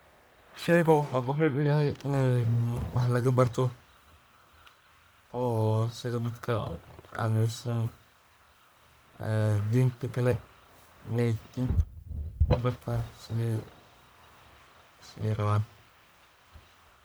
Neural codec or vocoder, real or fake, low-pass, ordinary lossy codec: codec, 44.1 kHz, 1.7 kbps, Pupu-Codec; fake; none; none